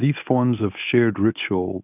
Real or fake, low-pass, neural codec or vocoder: fake; 3.6 kHz; codec, 24 kHz, 0.9 kbps, WavTokenizer, medium speech release version 1